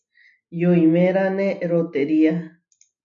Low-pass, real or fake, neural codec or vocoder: 7.2 kHz; real; none